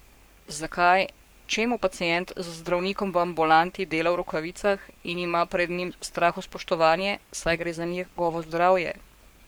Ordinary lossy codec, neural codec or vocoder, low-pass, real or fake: none; codec, 44.1 kHz, 7.8 kbps, Pupu-Codec; none; fake